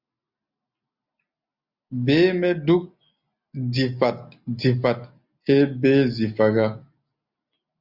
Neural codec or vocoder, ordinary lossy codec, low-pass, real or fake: none; Opus, 64 kbps; 5.4 kHz; real